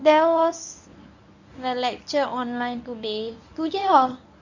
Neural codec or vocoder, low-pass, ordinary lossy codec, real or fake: codec, 24 kHz, 0.9 kbps, WavTokenizer, medium speech release version 1; 7.2 kHz; none; fake